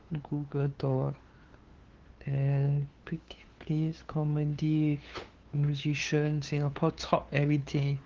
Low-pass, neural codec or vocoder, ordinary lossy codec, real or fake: 7.2 kHz; codec, 16 kHz, 2 kbps, FunCodec, trained on LibriTTS, 25 frames a second; Opus, 16 kbps; fake